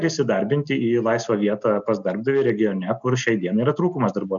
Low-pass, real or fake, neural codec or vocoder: 7.2 kHz; real; none